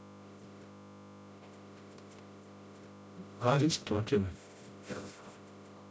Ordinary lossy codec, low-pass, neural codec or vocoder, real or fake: none; none; codec, 16 kHz, 0.5 kbps, FreqCodec, smaller model; fake